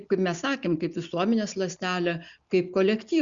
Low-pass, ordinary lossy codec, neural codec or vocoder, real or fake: 7.2 kHz; Opus, 64 kbps; none; real